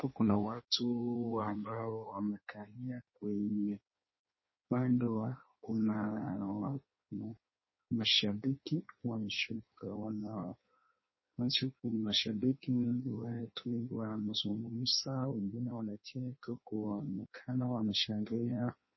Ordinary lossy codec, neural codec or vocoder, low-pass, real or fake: MP3, 24 kbps; codec, 16 kHz in and 24 kHz out, 1.1 kbps, FireRedTTS-2 codec; 7.2 kHz; fake